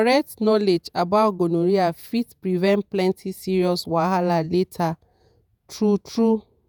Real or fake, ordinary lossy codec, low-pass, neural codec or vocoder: fake; none; none; vocoder, 48 kHz, 128 mel bands, Vocos